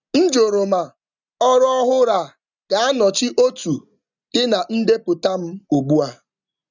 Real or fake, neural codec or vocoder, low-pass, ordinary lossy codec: real; none; 7.2 kHz; none